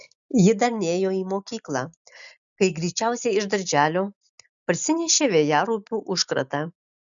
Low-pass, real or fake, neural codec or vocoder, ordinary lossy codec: 7.2 kHz; real; none; MP3, 96 kbps